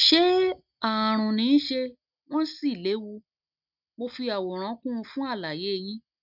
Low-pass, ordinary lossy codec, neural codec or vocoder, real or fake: 5.4 kHz; none; none; real